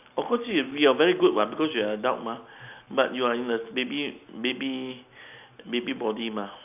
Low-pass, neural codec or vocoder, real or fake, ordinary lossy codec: 3.6 kHz; none; real; none